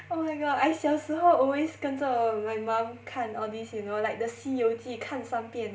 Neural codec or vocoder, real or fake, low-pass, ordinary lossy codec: none; real; none; none